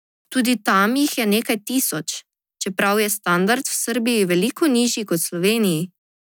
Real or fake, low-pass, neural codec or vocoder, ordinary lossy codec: real; none; none; none